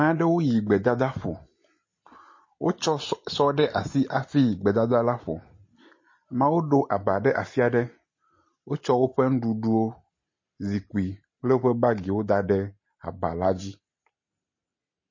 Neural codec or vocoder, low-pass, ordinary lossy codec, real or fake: none; 7.2 kHz; MP3, 32 kbps; real